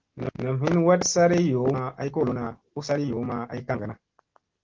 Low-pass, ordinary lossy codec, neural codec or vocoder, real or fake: 7.2 kHz; Opus, 16 kbps; none; real